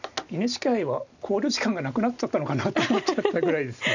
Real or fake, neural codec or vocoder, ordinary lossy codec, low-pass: real; none; none; 7.2 kHz